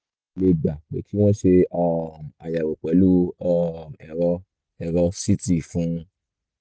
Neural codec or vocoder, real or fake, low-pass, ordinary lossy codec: none; real; none; none